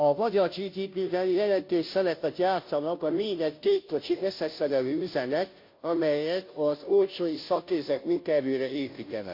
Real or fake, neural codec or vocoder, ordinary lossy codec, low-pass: fake; codec, 16 kHz, 0.5 kbps, FunCodec, trained on Chinese and English, 25 frames a second; MP3, 32 kbps; 5.4 kHz